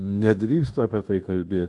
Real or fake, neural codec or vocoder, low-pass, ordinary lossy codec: fake; codec, 16 kHz in and 24 kHz out, 0.9 kbps, LongCat-Audio-Codec, fine tuned four codebook decoder; 10.8 kHz; AAC, 64 kbps